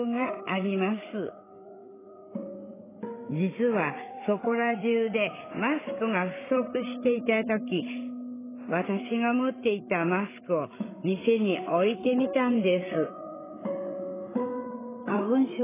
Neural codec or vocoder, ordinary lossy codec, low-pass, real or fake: codec, 16 kHz, 8 kbps, FreqCodec, larger model; AAC, 16 kbps; 3.6 kHz; fake